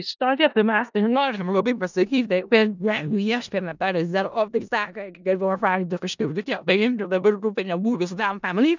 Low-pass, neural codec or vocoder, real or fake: 7.2 kHz; codec, 16 kHz in and 24 kHz out, 0.4 kbps, LongCat-Audio-Codec, four codebook decoder; fake